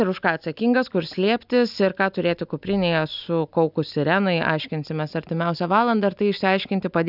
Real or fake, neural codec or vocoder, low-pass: real; none; 5.4 kHz